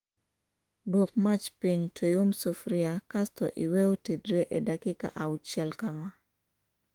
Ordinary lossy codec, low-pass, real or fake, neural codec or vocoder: Opus, 24 kbps; 19.8 kHz; fake; autoencoder, 48 kHz, 32 numbers a frame, DAC-VAE, trained on Japanese speech